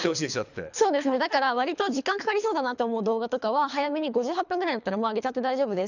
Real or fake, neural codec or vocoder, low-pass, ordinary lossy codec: fake; codec, 24 kHz, 3 kbps, HILCodec; 7.2 kHz; none